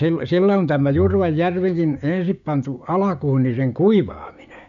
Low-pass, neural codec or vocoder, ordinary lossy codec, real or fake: 7.2 kHz; codec, 16 kHz, 6 kbps, DAC; none; fake